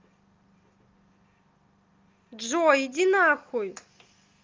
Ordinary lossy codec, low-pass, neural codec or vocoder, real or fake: Opus, 32 kbps; 7.2 kHz; none; real